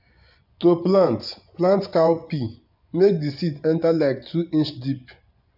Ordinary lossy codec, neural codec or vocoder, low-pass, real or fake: none; vocoder, 24 kHz, 100 mel bands, Vocos; 5.4 kHz; fake